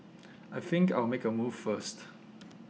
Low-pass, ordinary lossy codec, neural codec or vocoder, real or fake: none; none; none; real